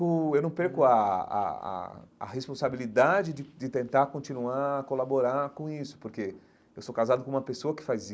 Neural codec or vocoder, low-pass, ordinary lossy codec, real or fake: none; none; none; real